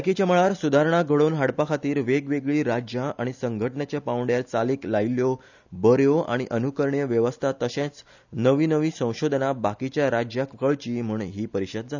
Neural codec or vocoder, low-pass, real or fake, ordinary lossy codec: none; 7.2 kHz; real; none